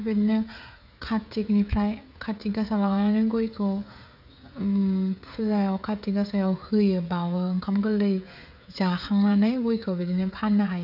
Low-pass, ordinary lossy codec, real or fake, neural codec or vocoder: 5.4 kHz; none; fake; codec, 16 kHz, 16 kbps, FreqCodec, smaller model